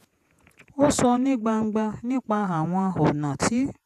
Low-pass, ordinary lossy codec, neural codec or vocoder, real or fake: 14.4 kHz; AAC, 96 kbps; vocoder, 48 kHz, 128 mel bands, Vocos; fake